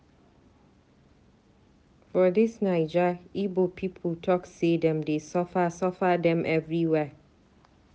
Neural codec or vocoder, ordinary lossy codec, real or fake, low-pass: none; none; real; none